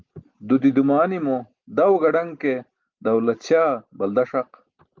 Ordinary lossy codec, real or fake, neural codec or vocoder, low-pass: Opus, 24 kbps; real; none; 7.2 kHz